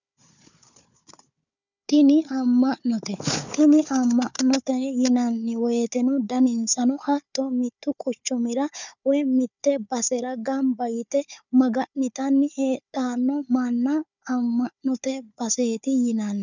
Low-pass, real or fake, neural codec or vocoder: 7.2 kHz; fake; codec, 16 kHz, 4 kbps, FunCodec, trained on Chinese and English, 50 frames a second